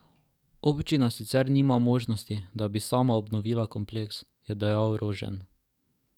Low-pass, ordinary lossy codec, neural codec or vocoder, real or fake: 19.8 kHz; none; codec, 44.1 kHz, 7.8 kbps, DAC; fake